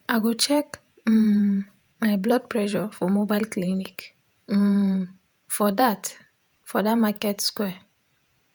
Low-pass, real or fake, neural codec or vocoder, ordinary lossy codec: none; fake; vocoder, 48 kHz, 128 mel bands, Vocos; none